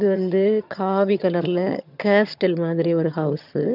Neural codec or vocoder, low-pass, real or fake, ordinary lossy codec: vocoder, 22.05 kHz, 80 mel bands, HiFi-GAN; 5.4 kHz; fake; none